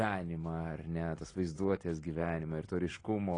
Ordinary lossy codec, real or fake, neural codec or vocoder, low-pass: AAC, 32 kbps; real; none; 9.9 kHz